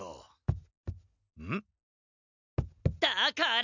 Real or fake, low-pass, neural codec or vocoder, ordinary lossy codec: real; 7.2 kHz; none; none